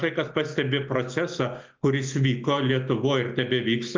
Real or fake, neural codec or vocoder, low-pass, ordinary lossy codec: real; none; 7.2 kHz; Opus, 24 kbps